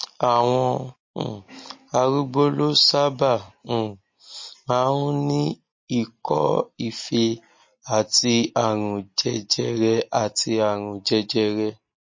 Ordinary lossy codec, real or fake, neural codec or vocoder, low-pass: MP3, 32 kbps; real; none; 7.2 kHz